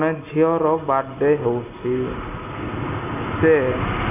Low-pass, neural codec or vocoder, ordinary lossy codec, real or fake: 3.6 kHz; none; none; real